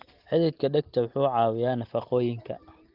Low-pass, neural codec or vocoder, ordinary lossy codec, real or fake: 5.4 kHz; none; Opus, 16 kbps; real